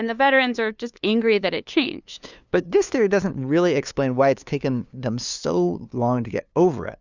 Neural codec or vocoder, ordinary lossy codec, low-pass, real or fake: codec, 16 kHz, 2 kbps, FunCodec, trained on LibriTTS, 25 frames a second; Opus, 64 kbps; 7.2 kHz; fake